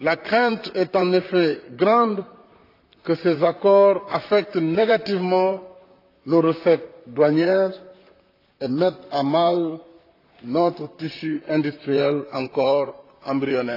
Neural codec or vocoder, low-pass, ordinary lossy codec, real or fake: vocoder, 44.1 kHz, 128 mel bands, Pupu-Vocoder; 5.4 kHz; AAC, 32 kbps; fake